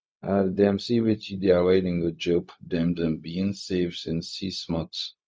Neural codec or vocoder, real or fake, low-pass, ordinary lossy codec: codec, 16 kHz, 0.4 kbps, LongCat-Audio-Codec; fake; none; none